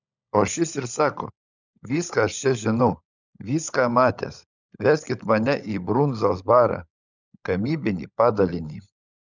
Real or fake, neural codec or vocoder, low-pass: fake; codec, 16 kHz, 16 kbps, FunCodec, trained on LibriTTS, 50 frames a second; 7.2 kHz